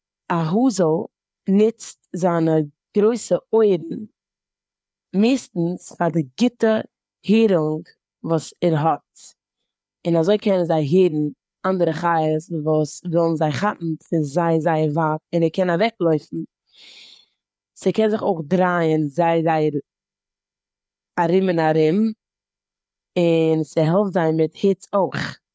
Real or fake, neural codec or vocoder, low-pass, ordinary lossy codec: fake; codec, 16 kHz, 4 kbps, FreqCodec, larger model; none; none